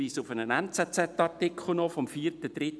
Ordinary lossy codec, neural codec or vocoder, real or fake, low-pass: none; none; real; none